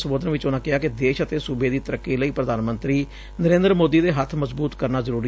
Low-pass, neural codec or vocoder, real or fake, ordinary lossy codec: none; none; real; none